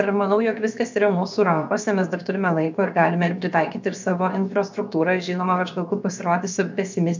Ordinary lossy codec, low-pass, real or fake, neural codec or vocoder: MP3, 64 kbps; 7.2 kHz; fake; codec, 16 kHz, about 1 kbps, DyCAST, with the encoder's durations